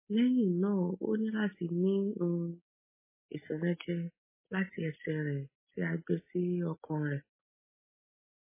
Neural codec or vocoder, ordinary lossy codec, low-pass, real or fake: none; MP3, 16 kbps; 3.6 kHz; real